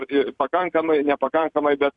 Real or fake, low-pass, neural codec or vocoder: fake; 10.8 kHz; vocoder, 44.1 kHz, 128 mel bands every 256 samples, BigVGAN v2